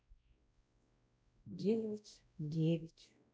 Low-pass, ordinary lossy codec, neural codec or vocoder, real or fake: none; none; codec, 16 kHz, 0.5 kbps, X-Codec, WavLM features, trained on Multilingual LibriSpeech; fake